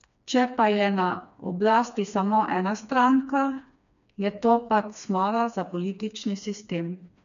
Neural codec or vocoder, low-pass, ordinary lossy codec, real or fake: codec, 16 kHz, 2 kbps, FreqCodec, smaller model; 7.2 kHz; none; fake